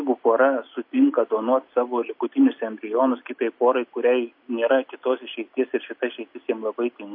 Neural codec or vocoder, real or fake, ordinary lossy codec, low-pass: none; real; MP3, 32 kbps; 5.4 kHz